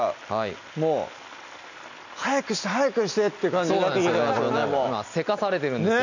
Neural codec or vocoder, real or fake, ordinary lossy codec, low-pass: none; real; none; 7.2 kHz